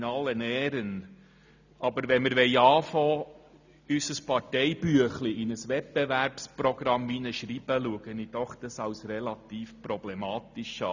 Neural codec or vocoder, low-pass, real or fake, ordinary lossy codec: none; 7.2 kHz; real; none